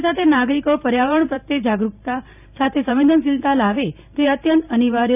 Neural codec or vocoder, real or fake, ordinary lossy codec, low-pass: vocoder, 44.1 kHz, 128 mel bands every 512 samples, BigVGAN v2; fake; none; 3.6 kHz